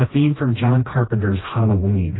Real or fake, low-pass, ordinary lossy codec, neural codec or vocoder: fake; 7.2 kHz; AAC, 16 kbps; codec, 16 kHz, 1 kbps, FreqCodec, smaller model